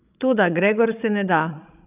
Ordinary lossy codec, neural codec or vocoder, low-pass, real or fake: none; codec, 16 kHz, 16 kbps, FreqCodec, larger model; 3.6 kHz; fake